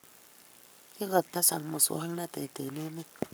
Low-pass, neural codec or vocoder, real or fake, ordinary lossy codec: none; codec, 44.1 kHz, 7.8 kbps, Pupu-Codec; fake; none